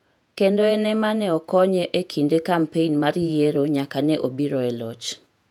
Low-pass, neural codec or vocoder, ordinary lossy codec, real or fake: 19.8 kHz; vocoder, 48 kHz, 128 mel bands, Vocos; none; fake